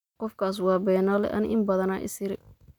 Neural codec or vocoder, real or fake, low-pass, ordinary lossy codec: none; real; 19.8 kHz; none